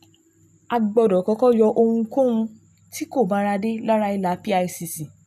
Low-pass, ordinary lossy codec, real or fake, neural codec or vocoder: 14.4 kHz; none; real; none